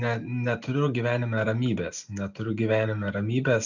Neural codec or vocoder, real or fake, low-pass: none; real; 7.2 kHz